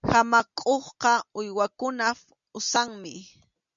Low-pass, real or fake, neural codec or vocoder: 7.2 kHz; real; none